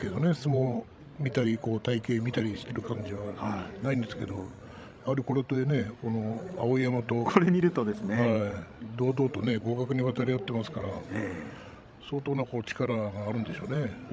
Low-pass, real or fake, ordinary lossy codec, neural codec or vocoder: none; fake; none; codec, 16 kHz, 16 kbps, FreqCodec, larger model